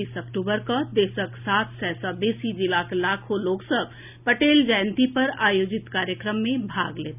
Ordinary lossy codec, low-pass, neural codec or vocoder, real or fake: none; 3.6 kHz; none; real